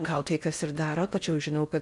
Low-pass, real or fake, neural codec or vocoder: 10.8 kHz; fake; codec, 16 kHz in and 24 kHz out, 0.6 kbps, FocalCodec, streaming, 4096 codes